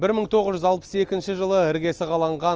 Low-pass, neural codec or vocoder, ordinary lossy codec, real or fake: 7.2 kHz; none; Opus, 24 kbps; real